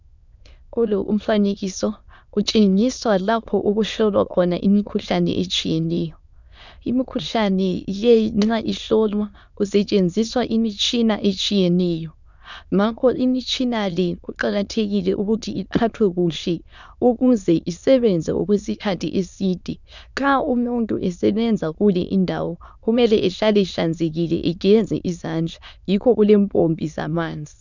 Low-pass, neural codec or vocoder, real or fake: 7.2 kHz; autoencoder, 22.05 kHz, a latent of 192 numbers a frame, VITS, trained on many speakers; fake